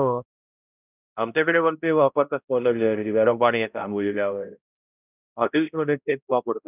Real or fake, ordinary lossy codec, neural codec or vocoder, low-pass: fake; none; codec, 16 kHz, 0.5 kbps, X-Codec, HuBERT features, trained on balanced general audio; 3.6 kHz